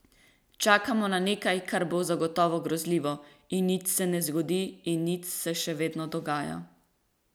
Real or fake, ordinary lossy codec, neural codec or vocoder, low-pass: real; none; none; none